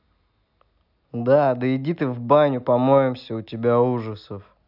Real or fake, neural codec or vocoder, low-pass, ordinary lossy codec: real; none; 5.4 kHz; none